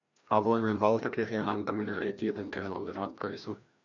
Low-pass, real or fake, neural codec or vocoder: 7.2 kHz; fake; codec, 16 kHz, 1 kbps, FreqCodec, larger model